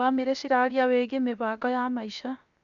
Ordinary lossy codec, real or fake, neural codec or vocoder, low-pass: none; fake; codec, 16 kHz, 0.7 kbps, FocalCodec; 7.2 kHz